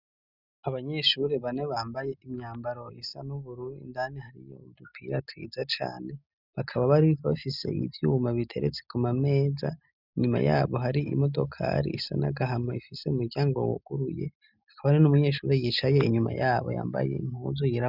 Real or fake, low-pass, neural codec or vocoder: real; 5.4 kHz; none